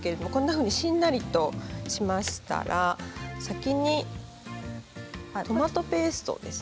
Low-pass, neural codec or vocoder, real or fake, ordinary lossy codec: none; none; real; none